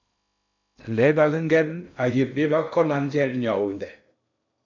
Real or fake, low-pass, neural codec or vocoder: fake; 7.2 kHz; codec, 16 kHz in and 24 kHz out, 0.6 kbps, FocalCodec, streaming, 2048 codes